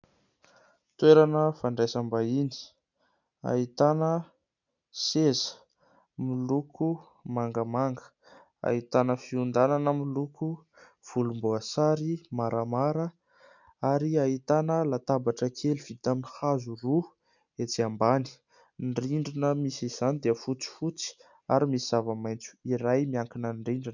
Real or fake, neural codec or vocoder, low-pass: real; none; 7.2 kHz